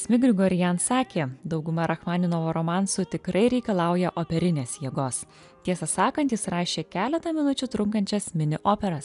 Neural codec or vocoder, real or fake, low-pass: none; real; 10.8 kHz